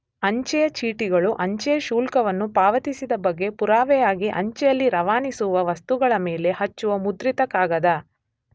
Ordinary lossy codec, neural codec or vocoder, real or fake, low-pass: none; none; real; none